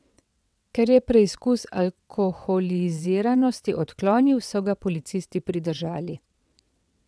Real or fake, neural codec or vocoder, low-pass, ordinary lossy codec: fake; vocoder, 22.05 kHz, 80 mel bands, WaveNeXt; none; none